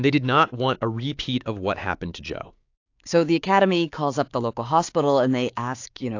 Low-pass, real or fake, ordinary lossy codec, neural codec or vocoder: 7.2 kHz; fake; AAC, 48 kbps; codec, 16 kHz, 6 kbps, DAC